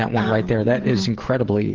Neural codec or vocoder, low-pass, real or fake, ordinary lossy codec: codec, 16 kHz, 16 kbps, FreqCodec, smaller model; 7.2 kHz; fake; Opus, 16 kbps